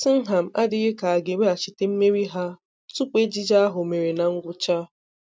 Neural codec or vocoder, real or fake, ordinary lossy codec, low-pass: none; real; none; none